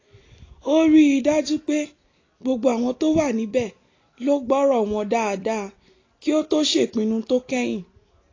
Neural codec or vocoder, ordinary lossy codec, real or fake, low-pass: none; AAC, 32 kbps; real; 7.2 kHz